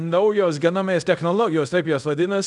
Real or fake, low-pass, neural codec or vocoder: fake; 10.8 kHz; codec, 24 kHz, 0.5 kbps, DualCodec